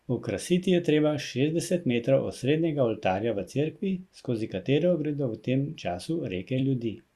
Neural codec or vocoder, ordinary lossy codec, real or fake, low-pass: vocoder, 44.1 kHz, 128 mel bands every 256 samples, BigVGAN v2; Opus, 64 kbps; fake; 14.4 kHz